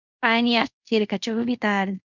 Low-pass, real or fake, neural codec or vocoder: 7.2 kHz; fake; codec, 24 kHz, 0.5 kbps, DualCodec